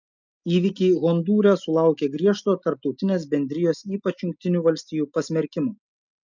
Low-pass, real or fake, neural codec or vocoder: 7.2 kHz; real; none